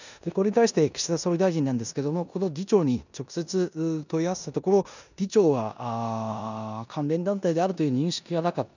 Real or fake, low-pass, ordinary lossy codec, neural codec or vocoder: fake; 7.2 kHz; none; codec, 16 kHz in and 24 kHz out, 0.9 kbps, LongCat-Audio-Codec, four codebook decoder